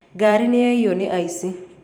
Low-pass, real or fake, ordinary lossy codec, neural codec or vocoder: 19.8 kHz; fake; none; vocoder, 44.1 kHz, 128 mel bands every 512 samples, BigVGAN v2